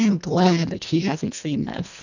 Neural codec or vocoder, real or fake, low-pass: codec, 24 kHz, 1.5 kbps, HILCodec; fake; 7.2 kHz